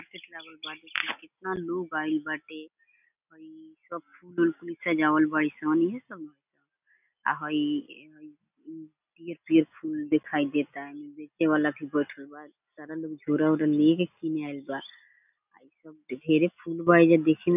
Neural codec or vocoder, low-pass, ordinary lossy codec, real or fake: none; 3.6 kHz; none; real